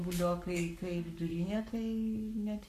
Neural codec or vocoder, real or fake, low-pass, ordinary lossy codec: codec, 44.1 kHz, 7.8 kbps, Pupu-Codec; fake; 14.4 kHz; AAC, 64 kbps